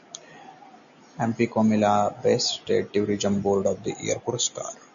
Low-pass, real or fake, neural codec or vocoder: 7.2 kHz; real; none